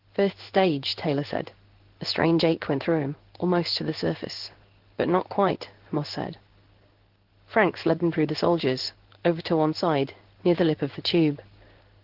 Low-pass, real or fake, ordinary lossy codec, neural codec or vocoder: 5.4 kHz; fake; Opus, 16 kbps; codec, 16 kHz in and 24 kHz out, 1 kbps, XY-Tokenizer